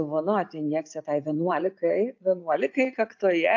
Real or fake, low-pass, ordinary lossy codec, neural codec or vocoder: real; 7.2 kHz; AAC, 48 kbps; none